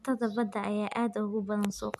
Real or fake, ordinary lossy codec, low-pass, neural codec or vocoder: real; none; 14.4 kHz; none